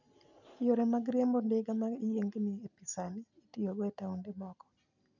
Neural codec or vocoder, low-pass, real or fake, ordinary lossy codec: none; 7.2 kHz; real; none